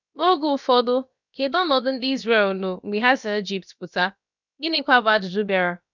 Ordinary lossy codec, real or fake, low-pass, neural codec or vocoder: none; fake; 7.2 kHz; codec, 16 kHz, about 1 kbps, DyCAST, with the encoder's durations